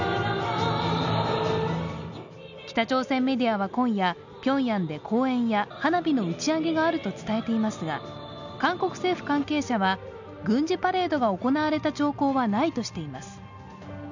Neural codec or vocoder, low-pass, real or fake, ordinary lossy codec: none; 7.2 kHz; real; none